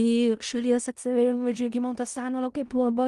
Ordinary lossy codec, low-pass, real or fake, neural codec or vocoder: Opus, 24 kbps; 10.8 kHz; fake; codec, 16 kHz in and 24 kHz out, 0.4 kbps, LongCat-Audio-Codec, four codebook decoder